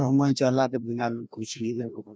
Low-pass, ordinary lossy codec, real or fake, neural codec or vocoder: none; none; fake; codec, 16 kHz, 1 kbps, FreqCodec, larger model